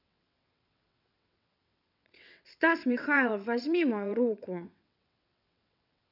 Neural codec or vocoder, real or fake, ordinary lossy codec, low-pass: vocoder, 22.05 kHz, 80 mel bands, WaveNeXt; fake; none; 5.4 kHz